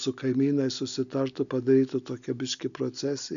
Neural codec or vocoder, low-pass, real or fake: none; 7.2 kHz; real